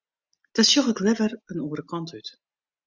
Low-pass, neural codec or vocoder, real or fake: 7.2 kHz; none; real